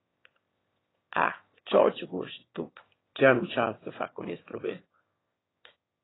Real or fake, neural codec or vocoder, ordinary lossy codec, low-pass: fake; autoencoder, 22.05 kHz, a latent of 192 numbers a frame, VITS, trained on one speaker; AAC, 16 kbps; 7.2 kHz